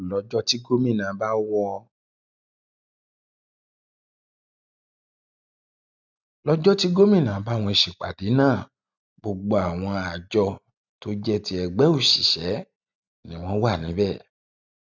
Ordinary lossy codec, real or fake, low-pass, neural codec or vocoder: none; real; 7.2 kHz; none